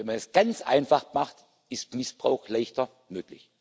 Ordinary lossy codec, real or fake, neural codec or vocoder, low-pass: none; real; none; none